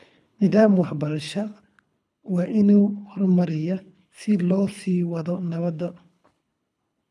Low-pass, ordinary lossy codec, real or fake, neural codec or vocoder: none; none; fake; codec, 24 kHz, 3 kbps, HILCodec